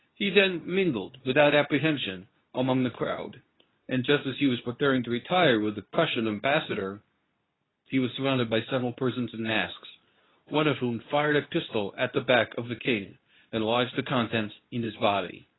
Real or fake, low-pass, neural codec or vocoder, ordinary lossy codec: fake; 7.2 kHz; codec, 24 kHz, 0.9 kbps, WavTokenizer, medium speech release version 2; AAC, 16 kbps